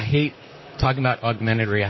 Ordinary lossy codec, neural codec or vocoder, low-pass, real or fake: MP3, 24 kbps; vocoder, 44.1 kHz, 128 mel bands, Pupu-Vocoder; 7.2 kHz; fake